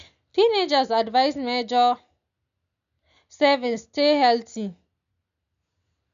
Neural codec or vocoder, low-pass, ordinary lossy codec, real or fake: none; 7.2 kHz; none; real